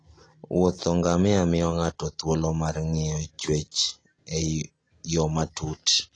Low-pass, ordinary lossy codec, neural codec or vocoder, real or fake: 9.9 kHz; AAC, 32 kbps; none; real